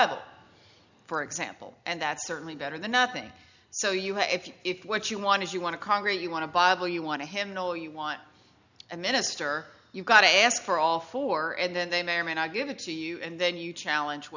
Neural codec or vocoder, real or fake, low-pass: none; real; 7.2 kHz